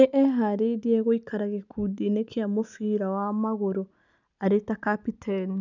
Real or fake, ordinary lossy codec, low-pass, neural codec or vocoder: real; none; 7.2 kHz; none